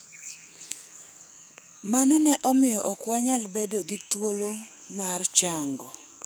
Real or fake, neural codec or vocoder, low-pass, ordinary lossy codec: fake; codec, 44.1 kHz, 2.6 kbps, SNAC; none; none